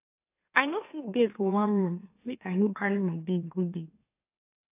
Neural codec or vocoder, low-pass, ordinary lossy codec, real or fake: autoencoder, 44.1 kHz, a latent of 192 numbers a frame, MeloTTS; 3.6 kHz; AAC, 24 kbps; fake